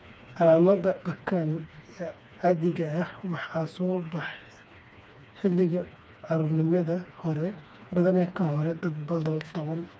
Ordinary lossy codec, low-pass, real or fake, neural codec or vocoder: none; none; fake; codec, 16 kHz, 2 kbps, FreqCodec, smaller model